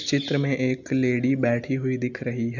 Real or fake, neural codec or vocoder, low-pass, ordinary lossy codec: real; none; 7.2 kHz; none